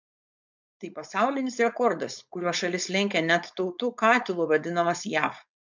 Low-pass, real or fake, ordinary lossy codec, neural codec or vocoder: 7.2 kHz; fake; MP3, 64 kbps; codec, 16 kHz, 4.8 kbps, FACodec